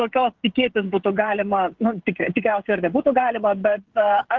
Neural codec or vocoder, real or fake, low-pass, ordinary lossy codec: codec, 16 kHz, 16 kbps, FreqCodec, smaller model; fake; 7.2 kHz; Opus, 16 kbps